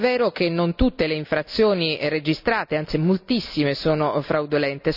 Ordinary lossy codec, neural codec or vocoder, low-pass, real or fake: none; none; 5.4 kHz; real